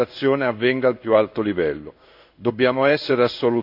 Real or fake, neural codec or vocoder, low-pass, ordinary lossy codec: fake; codec, 16 kHz in and 24 kHz out, 1 kbps, XY-Tokenizer; 5.4 kHz; none